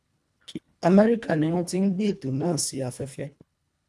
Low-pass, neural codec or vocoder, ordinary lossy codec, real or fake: none; codec, 24 kHz, 1.5 kbps, HILCodec; none; fake